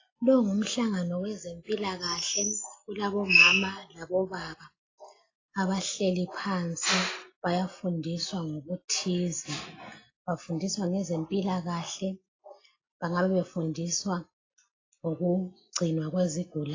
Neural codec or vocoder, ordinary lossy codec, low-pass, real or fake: none; AAC, 32 kbps; 7.2 kHz; real